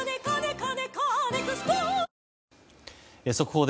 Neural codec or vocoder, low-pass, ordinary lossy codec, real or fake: none; none; none; real